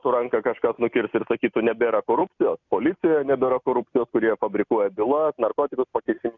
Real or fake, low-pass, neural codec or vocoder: real; 7.2 kHz; none